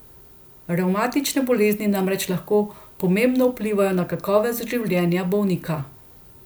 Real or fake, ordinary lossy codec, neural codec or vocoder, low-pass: real; none; none; none